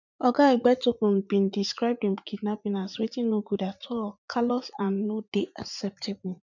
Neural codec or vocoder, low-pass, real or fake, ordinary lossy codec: vocoder, 44.1 kHz, 80 mel bands, Vocos; 7.2 kHz; fake; none